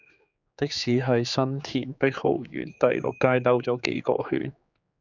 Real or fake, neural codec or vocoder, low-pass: fake; codec, 16 kHz, 4 kbps, X-Codec, HuBERT features, trained on general audio; 7.2 kHz